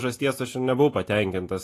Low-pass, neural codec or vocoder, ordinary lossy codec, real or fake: 14.4 kHz; none; AAC, 48 kbps; real